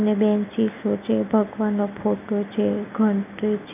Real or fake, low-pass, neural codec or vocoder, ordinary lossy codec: real; 3.6 kHz; none; none